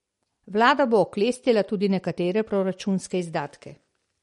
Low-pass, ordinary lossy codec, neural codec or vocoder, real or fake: 19.8 kHz; MP3, 48 kbps; none; real